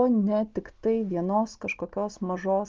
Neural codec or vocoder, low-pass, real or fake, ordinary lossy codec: none; 7.2 kHz; real; Opus, 32 kbps